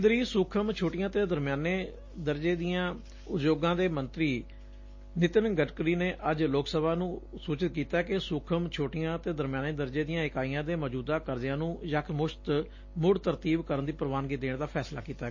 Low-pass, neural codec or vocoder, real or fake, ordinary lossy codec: 7.2 kHz; none; real; MP3, 32 kbps